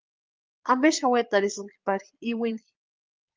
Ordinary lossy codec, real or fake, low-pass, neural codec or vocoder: Opus, 24 kbps; fake; 7.2 kHz; vocoder, 22.05 kHz, 80 mel bands, Vocos